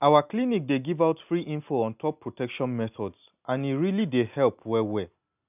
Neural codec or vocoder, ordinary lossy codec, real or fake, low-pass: none; none; real; 3.6 kHz